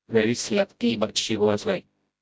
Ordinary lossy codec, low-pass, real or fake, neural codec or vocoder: none; none; fake; codec, 16 kHz, 0.5 kbps, FreqCodec, smaller model